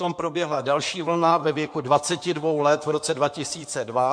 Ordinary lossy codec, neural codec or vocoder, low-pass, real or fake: MP3, 64 kbps; codec, 16 kHz in and 24 kHz out, 2.2 kbps, FireRedTTS-2 codec; 9.9 kHz; fake